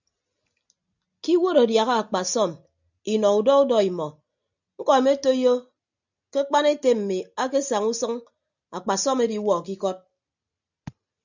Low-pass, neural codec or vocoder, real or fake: 7.2 kHz; none; real